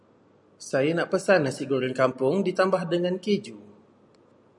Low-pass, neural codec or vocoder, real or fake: 10.8 kHz; none; real